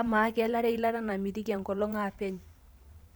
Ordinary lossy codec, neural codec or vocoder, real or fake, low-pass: none; vocoder, 44.1 kHz, 128 mel bands every 512 samples, BigVGAN v2; fake; none